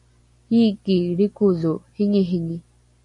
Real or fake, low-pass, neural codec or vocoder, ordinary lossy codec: real; 10.8 kHz; none; AAC, 64 kbps